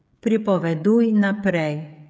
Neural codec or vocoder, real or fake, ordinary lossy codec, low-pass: codec, 16 kHz, 16 kbps, FreqCodec, smaller model; fake; none; none